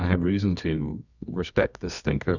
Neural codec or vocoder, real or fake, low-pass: codec, 24 kHz, 0.9 kbps, WavTokenizer, medium music audio release; fake; 7.2 kHz